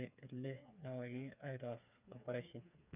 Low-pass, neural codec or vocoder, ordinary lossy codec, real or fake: 3.6 kHz; codec, 16 kHz, 4 kbps, FreqCodec, smaller model; none; fake